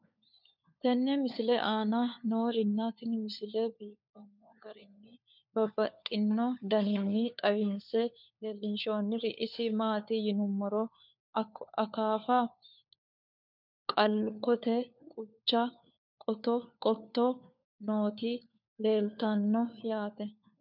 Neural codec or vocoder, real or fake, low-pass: codec, 16 kHz, 4 kbps, FunCodec, trained on LibriTTS, 50 frames a second; fake; 5.4 kHz